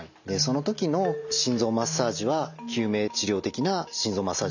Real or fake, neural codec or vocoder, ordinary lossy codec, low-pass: real; none; none; 7.2 kHz